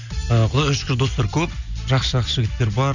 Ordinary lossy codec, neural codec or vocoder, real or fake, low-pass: none; none; real; 7.2 kHz